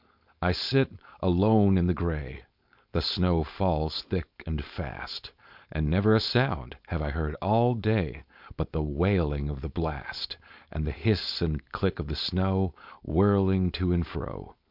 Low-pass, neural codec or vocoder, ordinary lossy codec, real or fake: 5.4 kHz; codec, 16 kHz, 4.8 kbps, FACodec; MP3, 48 kbps; fake